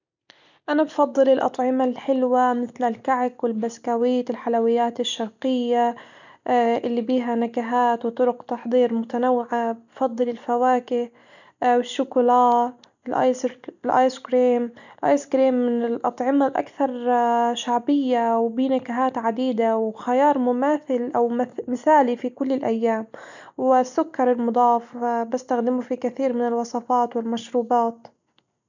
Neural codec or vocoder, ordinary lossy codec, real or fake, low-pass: none; none; real; 7.2 kHz